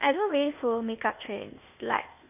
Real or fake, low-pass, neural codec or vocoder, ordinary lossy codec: fake; 3.6 kHz; codec, 16 kHz, 0.8 kbps, ZipCodec; none